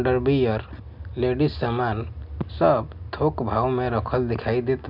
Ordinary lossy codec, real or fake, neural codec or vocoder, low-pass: none; real; none; 5.4 kHz